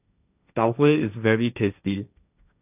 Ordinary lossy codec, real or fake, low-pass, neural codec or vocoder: none; fake; 3.6 kHz; codec, 16 kHz, 1.1 kbps, Voila-Tokenizer